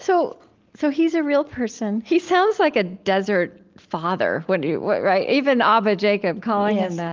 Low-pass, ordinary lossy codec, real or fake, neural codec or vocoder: 7.2 kHz; Opus, 32 kbps; real; none